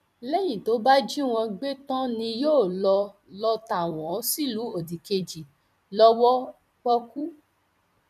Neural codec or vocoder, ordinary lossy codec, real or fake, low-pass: vocoder, 44.1 kHz, 128 mel bands every 256 samples, BigVGAN v2; none; fake; 14.4 kHz